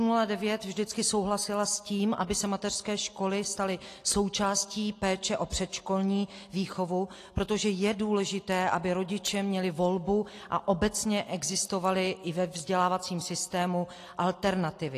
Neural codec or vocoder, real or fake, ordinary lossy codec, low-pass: none; real; AAC, 48 kbps; 14.4 kHz